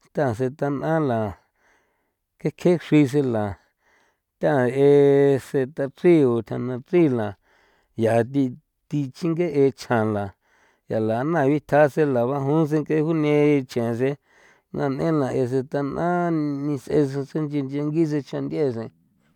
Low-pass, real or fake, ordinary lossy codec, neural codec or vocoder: 19.8 kHz; real; none; none